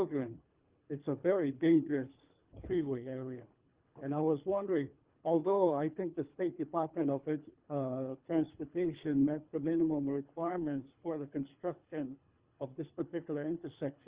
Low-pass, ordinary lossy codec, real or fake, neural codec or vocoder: 3.6 kHz; Opus, 32 kbps; fake; codec, 24 kHz, 3 kbps, HILCodec